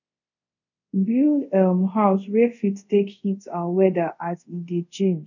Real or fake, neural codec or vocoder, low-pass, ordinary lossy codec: fake; codec, 24 kHz, 0.5 kbps, DualCodec; 7.2 kHz; none